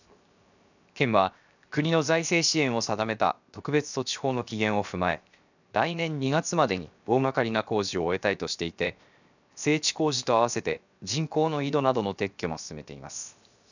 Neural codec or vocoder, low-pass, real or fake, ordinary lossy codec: codec, 16 kHz, 0.7 kbps, FocalCodec; 7.2 kHz; fake; none